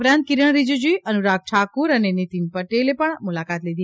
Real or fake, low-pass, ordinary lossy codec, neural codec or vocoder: real; none; none; none